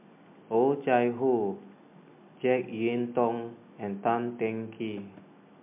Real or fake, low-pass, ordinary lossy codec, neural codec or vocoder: real; 3.6 kHz; MP3, 32 kbps; none